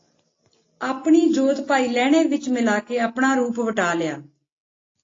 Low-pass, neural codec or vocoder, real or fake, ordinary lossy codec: 7.2 kHz; none; real; AAC, 32 kbps